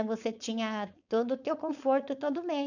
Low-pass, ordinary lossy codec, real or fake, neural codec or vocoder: 7.2 kHz; none; fake; codec, 16 kHz, 4.8 kbps, FACodec